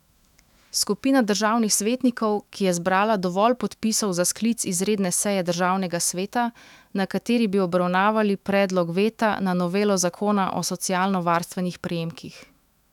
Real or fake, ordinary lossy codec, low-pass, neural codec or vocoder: fake; none; 19.8 kHz; autoencoder, 48 kHz, 128 numbers a frame, DAC-VAE, trained on Japanese speech